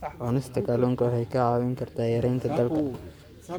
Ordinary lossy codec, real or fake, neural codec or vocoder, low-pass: none; fake; codec, 44.1 kHz, 7.8 kbps, Pupu-Codec; none